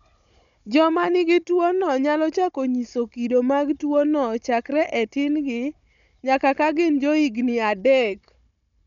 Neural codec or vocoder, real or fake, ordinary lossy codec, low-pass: codec, 16 kHz, 16 kbps, FunCodec, trained on Chinese and English, 50 frames a second; fake; MP3, 96 kbps; 7.2 kHz